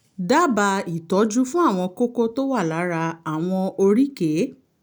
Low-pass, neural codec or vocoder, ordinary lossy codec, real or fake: none; none; none; real